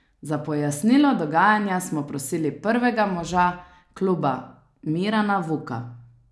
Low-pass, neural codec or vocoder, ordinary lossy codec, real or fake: none; none; none; real